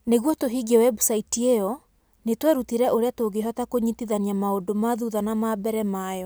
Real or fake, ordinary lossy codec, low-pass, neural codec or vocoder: real; none; none; none